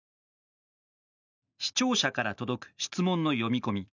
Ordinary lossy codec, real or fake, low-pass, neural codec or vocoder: none; real; 7.2 kHz; none